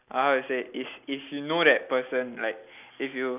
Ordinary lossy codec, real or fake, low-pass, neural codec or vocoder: none; real; 3.6 kHz; none